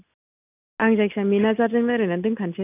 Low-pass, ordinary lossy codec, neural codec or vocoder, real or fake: 3.6 kHz; none; none; real